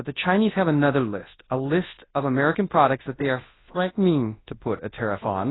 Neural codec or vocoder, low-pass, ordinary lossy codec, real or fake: codec, 24 kHz, 0.9 kbps, WavTokenizer, large speech release; 7.2 kHz; AAC, 16 kbps; fake